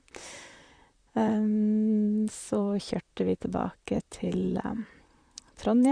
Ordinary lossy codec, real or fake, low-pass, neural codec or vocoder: Opus, 64 kbps; fake; 9.9 kHz; vocoder, 44.1 kHz, 128 mel bands, Pupu-Vocoder